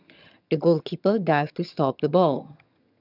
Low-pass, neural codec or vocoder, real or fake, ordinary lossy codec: 5.4 kHz; vocoder, 22.05 kHz, 80 mel bands, HiFi-GAN; fake; none